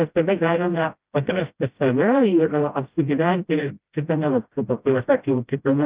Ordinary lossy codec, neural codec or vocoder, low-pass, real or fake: Opus, 32 kbps; codec, 16 kHz, 0.5 kbps, FreqCodec, smaller model; 3.6 kHz; fake